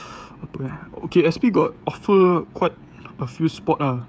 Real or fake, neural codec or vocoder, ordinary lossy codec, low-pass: fake; codec, 16 kHz, 16 kbps, FreqCodec, smaller model; none; none